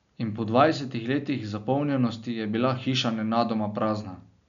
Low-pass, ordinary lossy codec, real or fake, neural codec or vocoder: 7.2 kHz; none; real; none